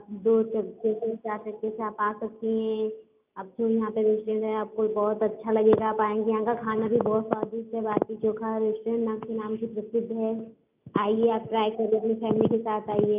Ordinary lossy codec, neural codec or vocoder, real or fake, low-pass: none; none; real; 3.6 kHz